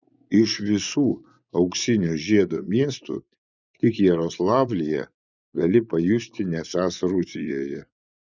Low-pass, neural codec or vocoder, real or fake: 7.2 kHz; vocoder, 24 kHz, 100 mel bands, Vocos; fake